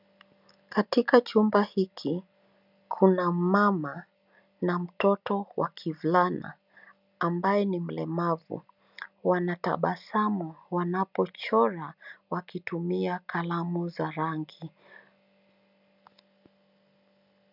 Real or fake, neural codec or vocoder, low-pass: real; none; 5.4 kHz